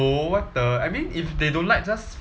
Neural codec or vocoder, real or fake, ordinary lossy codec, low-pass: none; real; none; none